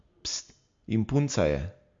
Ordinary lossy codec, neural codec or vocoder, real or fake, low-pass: MP3, 48 kbps; none; real; 7.2 kHz